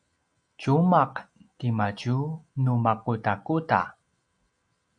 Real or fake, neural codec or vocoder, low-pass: real; none; 9.9 kHz